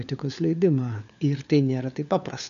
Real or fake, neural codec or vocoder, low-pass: fake; codec, 16 kHz, 4 kbps, FunCodec, trained on LibriTTS, 50 frames a second; 7.2 kHz